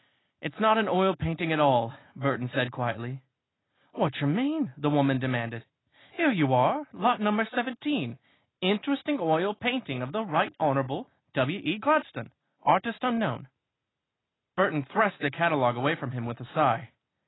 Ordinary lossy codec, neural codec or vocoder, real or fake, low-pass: AAC, 16 kbps; none; real; 7.2 kHz